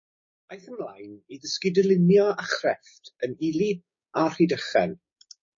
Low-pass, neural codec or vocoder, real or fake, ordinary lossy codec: 7.2 kHz; codec, 16 kHz, 6 kbps, DAC; fake; MP3, 32 kbps